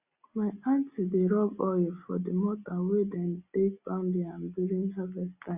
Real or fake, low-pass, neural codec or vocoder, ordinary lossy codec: real; 3.6 kHz; none; Opus, 64 kbps